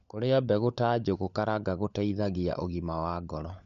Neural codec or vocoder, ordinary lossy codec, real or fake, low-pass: codec, 16 kHz, 16 kbps, FunCodec, trained on LibriTTS, 50 frames a second; none; fake; 7.2 kHz